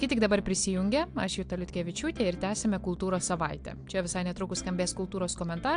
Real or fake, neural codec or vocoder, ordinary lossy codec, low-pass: real; none; AAC, 64 kbps; 9.9 kHz